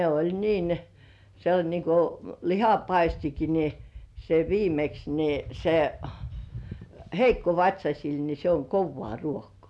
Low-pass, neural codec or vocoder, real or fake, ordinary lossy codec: none; none; real; none